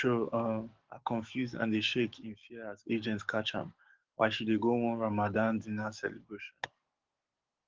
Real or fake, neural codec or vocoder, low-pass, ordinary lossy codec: fake; codec, 44.1 kHz, 7.8 kbps, DAC; 7.2 kHz; Opus, 16 kbps